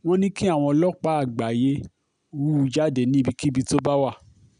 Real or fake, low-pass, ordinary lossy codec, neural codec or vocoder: real; 9.9 kHz; none; none